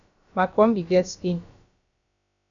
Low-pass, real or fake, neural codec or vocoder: 7.2 kHz; fake; codec, 16 kHz, about 1 kbps, DyCAST, with the encoder's durations